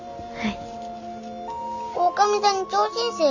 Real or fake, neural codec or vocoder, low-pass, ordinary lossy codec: real; none; 7.2 kHz; none